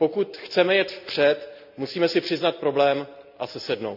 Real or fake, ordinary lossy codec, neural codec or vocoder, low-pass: real; none; none; 5.4 kHz